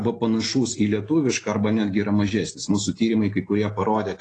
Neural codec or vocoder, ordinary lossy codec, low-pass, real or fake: none; AAC, 32 kbps; 9.9 kHz; real